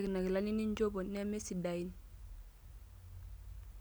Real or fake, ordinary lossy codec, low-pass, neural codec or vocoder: real; none; none; none